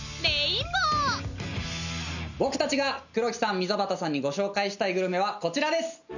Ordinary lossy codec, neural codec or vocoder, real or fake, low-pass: none; none; real; 7.2 kHz